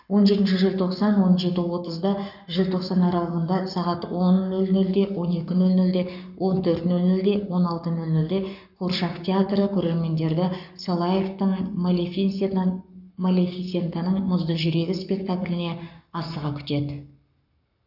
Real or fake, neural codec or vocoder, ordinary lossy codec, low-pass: fake; codec, 44.1 kHz, 7.8 kbps, Pupu-Codec; none; 5.4 kHz